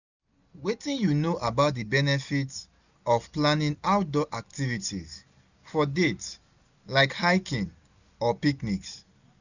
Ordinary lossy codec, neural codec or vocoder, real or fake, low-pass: none; none; real; 7.2 kHz